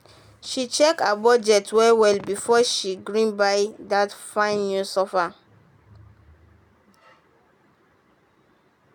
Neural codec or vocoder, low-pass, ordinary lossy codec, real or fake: none; 19.8 kHz; none; real